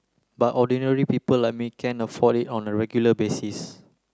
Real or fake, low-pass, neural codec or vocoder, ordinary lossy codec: real; none; none; none